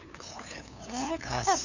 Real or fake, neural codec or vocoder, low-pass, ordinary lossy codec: fake; codec, 16 kHz, 2 kbps, FunCodec, trained on LibriTTS, 25 frames a second; 7.2 kHz; none